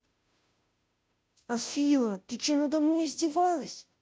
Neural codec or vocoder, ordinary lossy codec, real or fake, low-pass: codec, 16 kHz, 0.5 kbps, FunCodec, trained on Chinese and English, 25 frames a second; none; fake; none